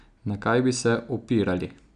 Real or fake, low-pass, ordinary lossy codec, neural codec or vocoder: real; 9.9 kHz; AAC, 96 kbps; none